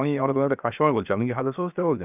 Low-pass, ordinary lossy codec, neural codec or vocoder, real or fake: 3.6 kHz; none; codec, 16 kHz, 0.7 kbps, FocalCodec; fake